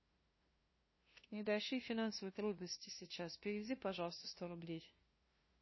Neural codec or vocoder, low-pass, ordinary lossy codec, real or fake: codec, 16 kHz, 0.5 kbps, FunCodec, trained on LibriTTS, 25 frames a second; 7.2 kHz; MP3, 24 kbps; fake